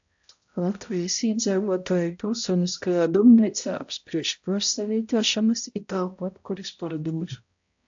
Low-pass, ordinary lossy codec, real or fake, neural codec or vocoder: 7.2 kHz; AAC, 64 kbps; fake; codec, 16 kHz, 0.5 kbps, X-Codec, HuBERT features, trained on balanced general audio